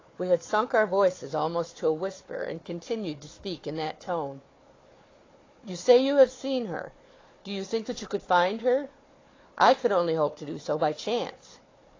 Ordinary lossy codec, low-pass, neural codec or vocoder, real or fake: AAC, 32 kbps; 7.2 kHz; codec, 16 kHz, 4 kbps, FunCodec, trained on Chinese and English, 50 frames a second; fake